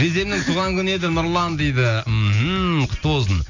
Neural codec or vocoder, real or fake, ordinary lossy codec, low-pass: none; real; none; 7.2 kHz